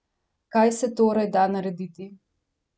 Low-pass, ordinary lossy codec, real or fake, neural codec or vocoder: none; none; real; none